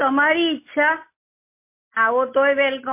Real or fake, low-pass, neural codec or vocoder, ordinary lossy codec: real; 3.6 kHz; none; MP3, 24 kbps